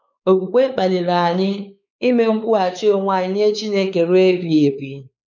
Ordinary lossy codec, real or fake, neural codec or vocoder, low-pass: none; fake; codec, 16 kHz, 4 kbps, X-Codec, WavLM features, trained on Multilingual LibriSpeech; 7.2 kHz